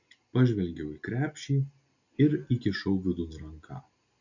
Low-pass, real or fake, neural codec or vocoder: 7.2 kHz; real; none